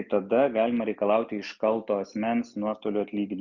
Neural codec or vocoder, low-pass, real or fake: none; 7.2 kHz; real